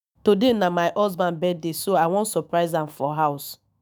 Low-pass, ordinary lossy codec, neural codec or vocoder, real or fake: none; none; autoencoder, 48 kHz, 128 numbers a frame, DAC-VAE, trained on Japanese speech; fake